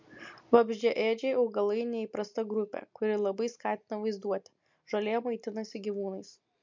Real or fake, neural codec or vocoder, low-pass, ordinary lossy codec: real; none; 7.2 kHz; MP3, 48 kbps